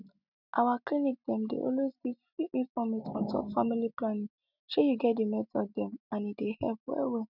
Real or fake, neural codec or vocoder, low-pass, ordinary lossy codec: real; none; 5.4 kHz; none